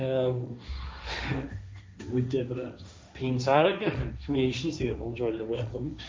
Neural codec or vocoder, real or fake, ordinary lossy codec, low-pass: codec, 16 kHz, 1.1 kbps, Voila-Tokenizer; fake; none; none